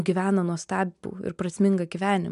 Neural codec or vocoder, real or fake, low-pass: none; real; 10.8 kHz